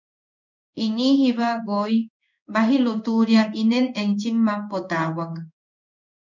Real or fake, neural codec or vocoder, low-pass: fake; codec, 16 kHz in and 24 kHz out, 1 kbps, XY-Tokenizer; 7.2 kHz